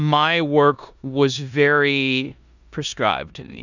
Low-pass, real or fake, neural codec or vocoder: 7.2 kHz; fake; codec, 16 kHz in and 24 kHz out, 0.9 kbps, LongCat-Audio-Codec, four codebook decoder